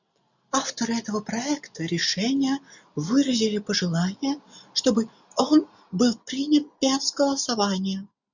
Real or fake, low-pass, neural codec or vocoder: real; 7.2 kHz; none